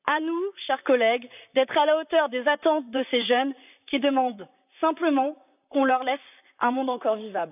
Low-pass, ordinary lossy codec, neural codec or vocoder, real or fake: 3.6 kHz; none; vocoder, 44.1 kHz, 128 mel bands, Pupu-Vocoder; fake